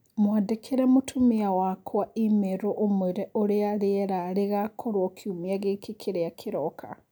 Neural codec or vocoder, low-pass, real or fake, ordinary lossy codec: none; none; real; none